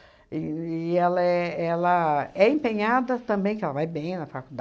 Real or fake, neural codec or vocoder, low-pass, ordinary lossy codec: real; none; none; none